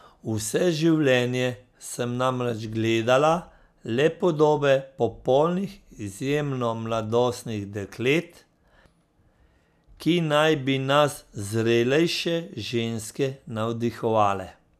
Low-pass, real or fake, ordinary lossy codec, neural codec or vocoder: 14.4 kHz; real; none; none